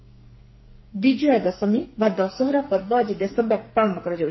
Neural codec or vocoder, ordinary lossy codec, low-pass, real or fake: codec, 44.1 kHz, 2.6 kbps, SNAC; MP3, 24 kbps; 7.2 kHz; fake